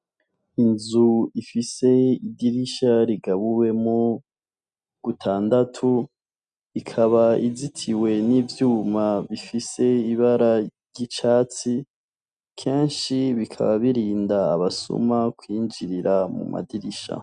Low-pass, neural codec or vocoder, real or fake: 9.9 kHz; none; real